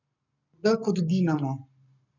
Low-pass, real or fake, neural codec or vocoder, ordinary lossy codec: 7.2 kHz; fake; codec, 44.1 kHz, 7.8 kbps, Pupu-Codec; none